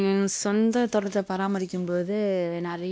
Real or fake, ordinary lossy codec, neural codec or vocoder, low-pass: fake; none; codec, 16 kHz, 1 kbps, X-Codec, WavLM features, trained on Multilingual LibriSpeech; none